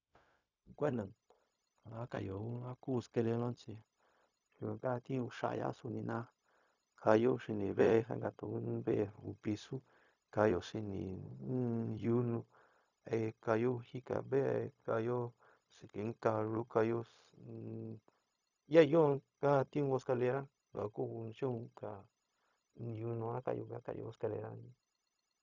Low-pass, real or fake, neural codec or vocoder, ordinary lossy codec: 7.2 kHz; fake; codec, 16 kHz, 0.4 kbps, LongCat-Audio-Codec; none